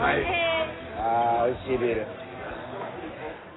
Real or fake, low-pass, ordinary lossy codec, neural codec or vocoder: real; 7.2 kHz; AAC, 16 kbps; none